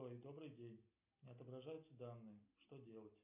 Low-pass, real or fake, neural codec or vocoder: 3.6 kHz; real; none